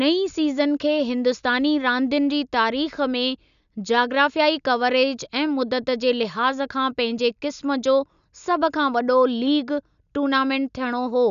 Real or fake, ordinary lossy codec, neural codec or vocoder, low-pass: real; none; none; 7.2 kHz